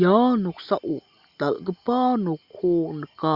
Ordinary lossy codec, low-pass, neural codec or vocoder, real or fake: Opus, 64 kbps; 5.4 kHz; none; real